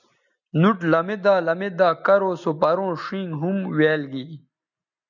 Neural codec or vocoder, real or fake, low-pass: none; real; 7.2 kHz